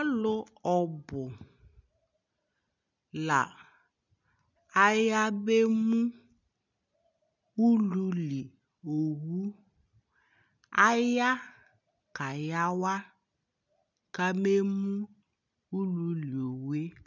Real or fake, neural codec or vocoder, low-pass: real; none; 7.2 kHz